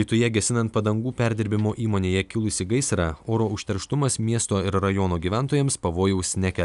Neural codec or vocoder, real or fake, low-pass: none; real; 10.8 kHz